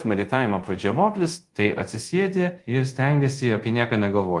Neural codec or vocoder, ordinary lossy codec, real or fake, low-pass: codec, 24 kHz, 0.5 kbps, DualCodec; Opus, 24 kbps; fake; 10.8 kHz